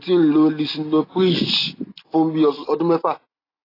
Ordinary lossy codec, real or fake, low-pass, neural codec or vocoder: AAC, 24 kbps; real; 5.4 kHz; none